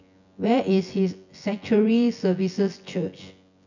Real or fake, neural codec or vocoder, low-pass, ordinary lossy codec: fake; vocoder, 24 kHz, 100 mel bands, Vocos; 7.2 kHz; none